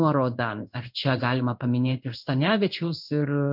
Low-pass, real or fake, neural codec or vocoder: 5.4 kHz; fake; codec, 16 kHz in and 24 kHz out, 1 kbps, XY-Tokenizer